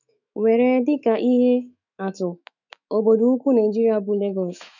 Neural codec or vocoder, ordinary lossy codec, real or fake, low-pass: autoencoder, 48 kHz, 128 numbers a frame, DAC-VAE, trained on Japanese speech; none; fake; 7.2 kHz